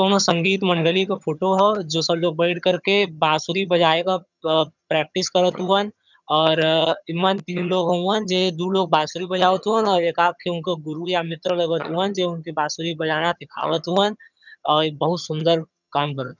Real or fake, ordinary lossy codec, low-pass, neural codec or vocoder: fake; none; 7.2 kHz; vocoder, 22.05 kHz, 80 mel bands, HiFi-GAN